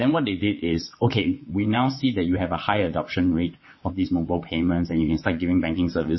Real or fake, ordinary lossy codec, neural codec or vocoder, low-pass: fake; MP3, 24 kbps; vocoder, 22.05 kHz, 80 mel bands, WaveNeXt; 7.2 kHz